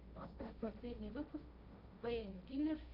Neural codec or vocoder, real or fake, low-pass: codec, 16 kHz, 1.1 kbps, Voila-Tokenizer; fake; 5.4 kHz